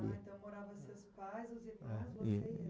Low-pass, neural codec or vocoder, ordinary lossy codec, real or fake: none; none; none; real